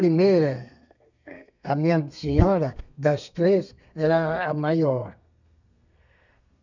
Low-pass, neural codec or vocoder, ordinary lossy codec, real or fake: 7.2 kHz; codec, 32 kHz, 1.9 kbps, SNAC; none; fake